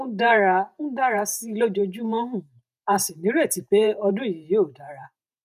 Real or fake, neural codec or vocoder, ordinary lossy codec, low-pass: fake; vocoder, 48 kHz, 128 mel bands, Vocos; none; 14.4 kHz